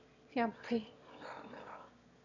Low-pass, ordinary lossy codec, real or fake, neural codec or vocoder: 7.2 kHz; none; fake; autoencoder, 22.05 kHz, a latent of 192 numbers a frame, VITS, trained on one speaker